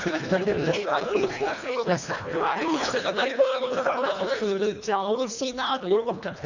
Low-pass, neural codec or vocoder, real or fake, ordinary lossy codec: 7.2 kHz; codec, 24 kHz, 1.5 kbps, HILCodec; fake; none